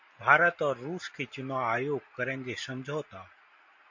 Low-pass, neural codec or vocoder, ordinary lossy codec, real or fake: 7.2 kHz; none; MP3, 48 kbps; real